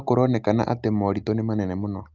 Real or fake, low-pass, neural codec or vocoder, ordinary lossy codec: real; 7.2 kHz; none; Opus, 24 kbps